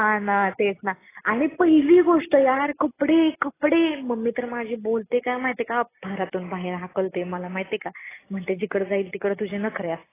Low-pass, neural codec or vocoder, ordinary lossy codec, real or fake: 3.6 kHz; none; AAC, 16 kbps; real